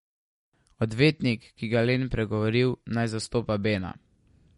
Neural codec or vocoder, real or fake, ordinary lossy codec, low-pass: none; real; MP3, 48 kbps; 19.8 kHz